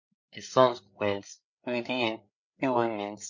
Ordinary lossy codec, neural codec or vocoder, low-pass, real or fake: MP3, 48 kbps; codec, 16 kHz, 8 kbps, FreqCodec, larger model; 7.2 kHz; fake